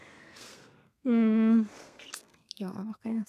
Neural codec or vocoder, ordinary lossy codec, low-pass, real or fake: codec, 32 kHz, 1.9 kbps, SNAC; none; 14.4 kHz; fake